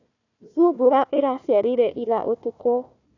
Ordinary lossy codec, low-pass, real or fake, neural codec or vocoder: none; 7.2 kHz; fake; codec, 16 kHz, 1 kbps, FunCodec, trained on Chinese and English, 50 frames a second